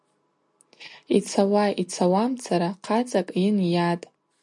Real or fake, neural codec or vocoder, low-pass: real; none; 10.8 kHz